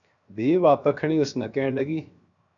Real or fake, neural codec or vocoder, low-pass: fake; codec, 16 kHz, 0.7 kbps, FocalCodec; 7.2 kHz